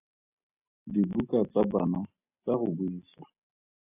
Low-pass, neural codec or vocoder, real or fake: 3.6 kHz; none; real